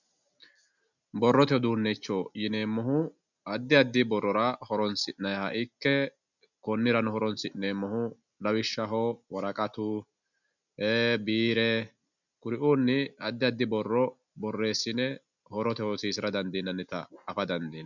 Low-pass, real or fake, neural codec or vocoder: 7.2 kHz; real; none